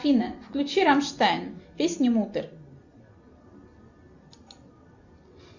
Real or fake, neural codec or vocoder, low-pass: real; none; 7.2 kHz